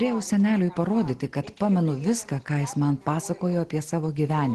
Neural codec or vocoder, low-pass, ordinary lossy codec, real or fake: none; 10.8 kHz; Opus, 16 kbps; real